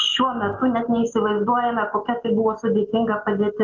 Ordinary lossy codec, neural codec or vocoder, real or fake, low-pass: Opus, 32 kbps; none; real; 7.2 kHz